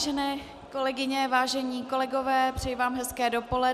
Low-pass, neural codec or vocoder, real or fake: 14.4 kHz; none; real